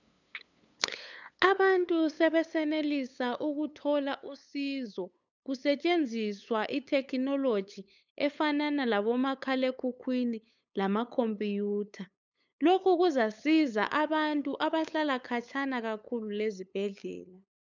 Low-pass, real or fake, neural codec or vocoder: 7.2 kHz; fake; codec, 16 kHz, 8 kbps, FunCodec, trained on LibriTTS, 25 frames a second